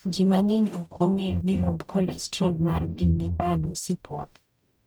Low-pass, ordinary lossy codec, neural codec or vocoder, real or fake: none; none; codec, 44.1 kHz, 0.9 kbps, DAC; fake